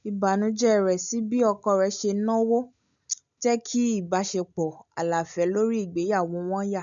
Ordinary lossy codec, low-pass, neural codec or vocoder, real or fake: MP3, 96 kbps; 7.2 kHz; none; real